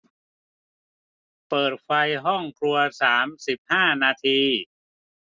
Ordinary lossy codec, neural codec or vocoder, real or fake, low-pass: none; none; real; none